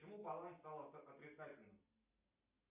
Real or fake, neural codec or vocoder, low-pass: real; none; 3.6 kHz